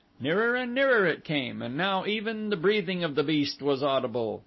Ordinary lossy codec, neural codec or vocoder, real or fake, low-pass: MP3, 24 kbps; none; real; 7.2 kHz